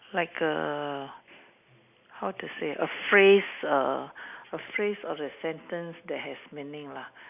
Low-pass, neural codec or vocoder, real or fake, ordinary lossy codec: 3.6 kHz; none; real; none